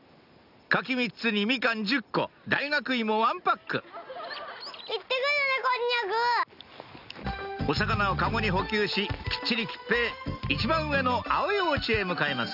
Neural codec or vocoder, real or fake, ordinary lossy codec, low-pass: none; real; none; 5.4 kHz